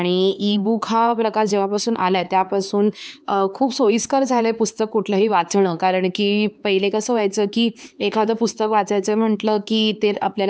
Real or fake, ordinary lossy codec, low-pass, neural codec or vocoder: fake; none; none; codec, 16 kHz, 4 kbps, X-Codec, HuBERT features, trained on LibriSpeech